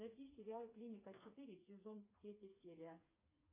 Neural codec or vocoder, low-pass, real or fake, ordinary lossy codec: codec, 16 kHz, 4 kbps, FreqCodec, smaller model; 3.6 kHz; fake; AAC, 24 kbps